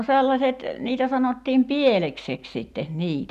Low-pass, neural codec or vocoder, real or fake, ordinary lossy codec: 14.4 kHz; none; real; none